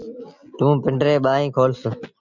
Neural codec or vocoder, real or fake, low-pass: none; real; 7.2 kHz